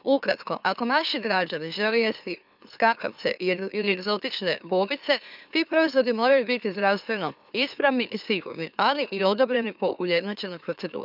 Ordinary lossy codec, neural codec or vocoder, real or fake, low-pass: none; autoencoder, 44.1 kHz, a latent of 192 numbers a frame, MeloTTS; fake; 5.4 kHz